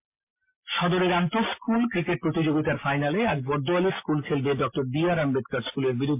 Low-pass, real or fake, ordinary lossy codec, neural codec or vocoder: 3.6 kHz; real; MP3, 24 kbps; none